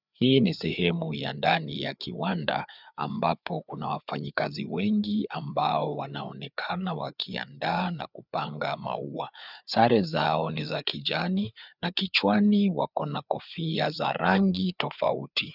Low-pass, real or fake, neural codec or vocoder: 5.4 kHz; fake; codec, 16 kHz, 4 kbps, FreqCodec, larger model